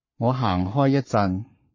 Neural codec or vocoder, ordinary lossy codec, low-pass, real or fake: codec, 44.1 kHz, 7.8 kbps, Pupu-Codec; MP3, 32 kbps; 7.2 kHz; fake